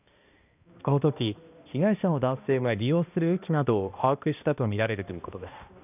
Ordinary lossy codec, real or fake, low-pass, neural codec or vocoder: none; fake; 3.6 kHz; codec, 16 kHz, 1 kbps, X-Codec, HuBERT features, trained on balanced general audio